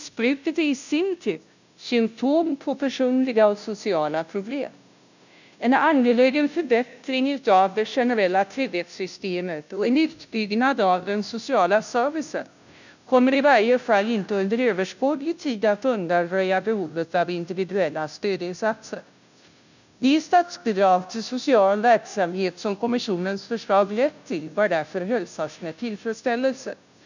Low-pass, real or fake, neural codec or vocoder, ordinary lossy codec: 7.2 kHz; fake; codec, 16 kHz, 0.5 kbps, FunCodec, trained on Chinese and English, 25 frames a second; none